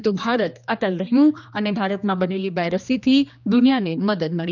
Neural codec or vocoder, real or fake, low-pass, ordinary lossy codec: codec, 16 kHz, 2 kbps, X-Codec, HuBERT features, trained on general audio; fake; 7.2 kHz; none